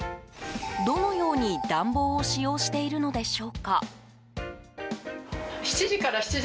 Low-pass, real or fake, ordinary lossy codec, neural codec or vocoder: none; real; none; none